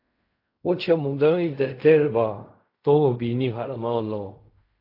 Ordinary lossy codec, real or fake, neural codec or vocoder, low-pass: AAC, 32 kbps; fake; codec, 16 kHz in and 24 kHz out, 0.4 kbps, LongCat-Audio-Codec, fine tuned four codebook decoder; 5.4 kHz